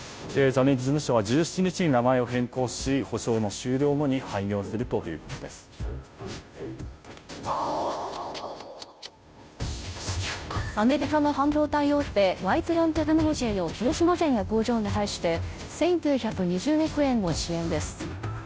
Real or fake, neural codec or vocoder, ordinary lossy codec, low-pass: fake; codec, 16 kHz, 0.5 kbps, FunCodec, trained on Chinese and English, 25 frames a second; none; none